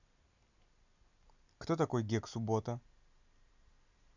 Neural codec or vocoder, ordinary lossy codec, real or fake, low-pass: none; none; real; 7.2 kHz